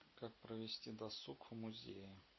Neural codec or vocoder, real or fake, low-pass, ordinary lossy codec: none; real; 7.2 kHz; MP3, 24 kbps